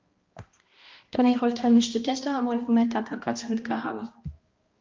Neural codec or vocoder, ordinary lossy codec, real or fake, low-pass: codec, 16 kHz, 1 kbps, X-Codec, HuBERT features, trained on balanced general audio; Opus, 32 kbps; fake; 7.2 kHz